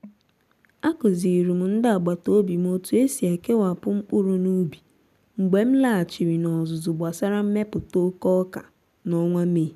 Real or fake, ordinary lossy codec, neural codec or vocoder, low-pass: real; none; none; 14.4 kHz